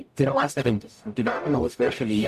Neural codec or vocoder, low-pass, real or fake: codec, 44.1 kHz, 0.9 kbps, DAC; 14.4 kHz; fake